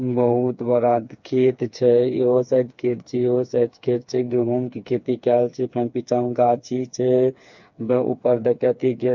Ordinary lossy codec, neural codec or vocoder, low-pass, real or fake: MP3, 64 kbps; codec, 16 kHz, 4 kbps, FreqCodec, smaller model; 7.2 kHz; fake